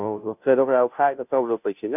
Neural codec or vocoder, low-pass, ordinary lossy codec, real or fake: codec, 16 kHz, 0.5 kbps, FunCodec, trained on Chinese and English, 25 frames a second; 3.6 kHz; none; fake